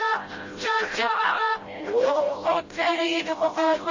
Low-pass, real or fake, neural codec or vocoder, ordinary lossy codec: 7.2 kHz; fake; codec, 16 kHz, 0.5 kbps, FreqCodec, smaller model; MP3, 32 kbps